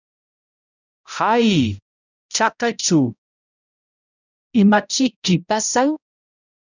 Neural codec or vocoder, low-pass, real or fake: codec, 16 kHz, 0.5 kbps, X-Codec, HuBERT features, trained on balanced general audio; 7.2 kHz; fake